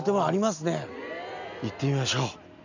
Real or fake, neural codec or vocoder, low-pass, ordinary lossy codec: real; none; 7.2 kHz; none